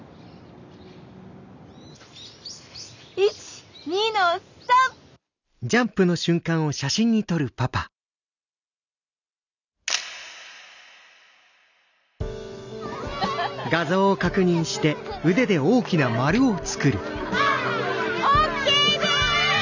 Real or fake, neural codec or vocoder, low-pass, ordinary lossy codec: real; none; 7.2 kHz; none